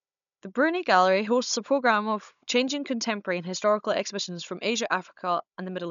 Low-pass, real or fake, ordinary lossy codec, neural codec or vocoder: 7.2 kHz; fake; none; codec, 16 kHz, 16 kbps, FunCodec, trained on Chinese and English, 50 frames a second